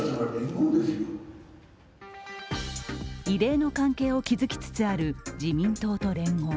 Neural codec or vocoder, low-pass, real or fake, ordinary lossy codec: none; none; real; none